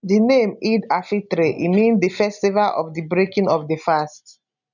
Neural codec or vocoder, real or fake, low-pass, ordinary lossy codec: none; real; 7.2 kHz; none